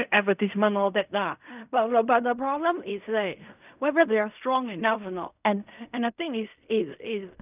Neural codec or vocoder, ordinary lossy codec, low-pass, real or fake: codec, 16 kHz in and 24 kHz out, 0.4 kbps, LongCat-Audio-Codec, fine tuned four codebook decoder; none; 3.6 kHz; fake